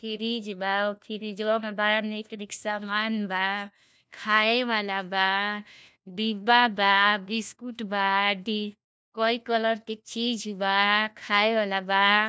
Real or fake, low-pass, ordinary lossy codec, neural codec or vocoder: fake; none; none; codec, 16 kHz, 1 kbps, FunCodec, trained on LibriTTS, 50 frames a second